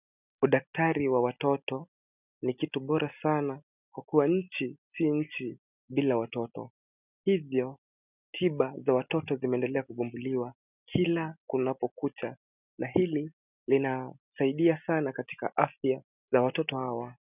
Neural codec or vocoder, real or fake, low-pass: none; real; 3.6 kHz